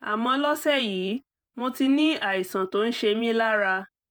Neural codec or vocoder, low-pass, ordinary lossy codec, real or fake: vocoder, 48 kHz, 128 mel bands, Vocos; none; none; fake